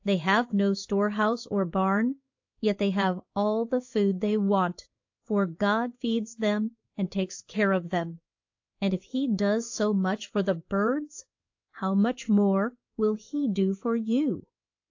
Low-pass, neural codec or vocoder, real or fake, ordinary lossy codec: 7.2 kHz; codec, 16 kHz in and 24 kHz out, 1 kbps, XY-Tokenizer; fake; AAC, 48 kbps